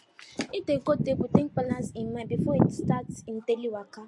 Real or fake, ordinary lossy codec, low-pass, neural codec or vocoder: real; MP3, 48 kbps; 10.8 kHz; none